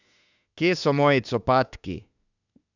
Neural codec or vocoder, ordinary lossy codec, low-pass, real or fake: autoencoder, 48 kHz, 32 numbers a frame, DAC-VAE, trained on Japanese speech; none; 7.2 kHz; fake